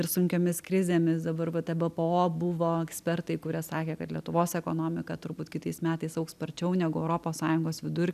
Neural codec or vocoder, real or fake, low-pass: none; real; 14.4 kHz